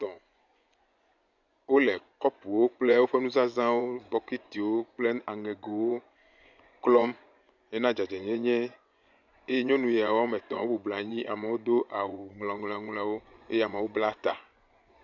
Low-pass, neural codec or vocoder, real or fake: 7.2 kHz; vocoder, 24 kHz, 100 mel bands, Vocos; fake